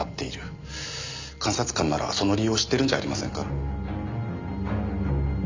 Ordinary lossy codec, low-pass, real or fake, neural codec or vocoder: MP3, 64 kbps; 7.2 kHz; real; none